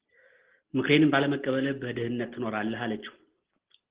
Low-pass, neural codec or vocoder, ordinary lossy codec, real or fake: 3.6 kHz; none; Opus, 16 kbps; real